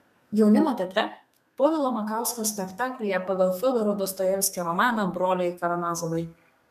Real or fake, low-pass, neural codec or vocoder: fake; 14.4 kHz; codec, 32 kHz, 1.9 kbps, SNAC